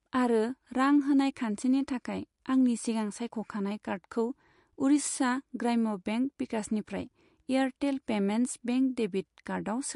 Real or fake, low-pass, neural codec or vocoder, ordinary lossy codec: real; 14.4 kHz; none; MP3, 48 kbps